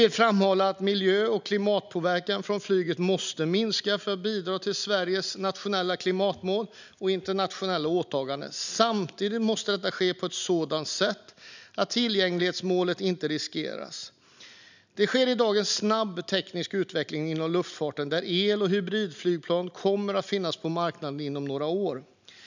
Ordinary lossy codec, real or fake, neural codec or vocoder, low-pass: none; real; none; 7.2 kHz